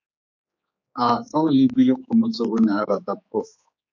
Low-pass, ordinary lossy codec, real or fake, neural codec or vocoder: 7.2 kHz; MP3, 48 kbps; fake; codec, 16 kHz, 4 kbps, X-Codec, HuBERT features, trained on general audio